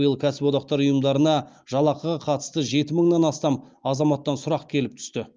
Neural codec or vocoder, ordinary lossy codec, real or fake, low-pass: none; Opus, 24 kbps; real; 7.2 kHz